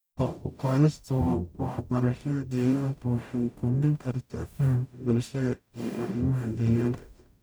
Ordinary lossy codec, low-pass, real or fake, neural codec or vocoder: none; none; fake; codec, 44.1 kHz, 0.9 kbps, DAC